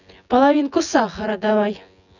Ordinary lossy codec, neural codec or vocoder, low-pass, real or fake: none; vocoder, 24 kHz, 100 mel bands, Vocos; 7.2 kHz; fake